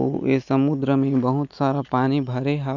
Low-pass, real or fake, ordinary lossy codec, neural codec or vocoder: 7.2 kHz; real; none; none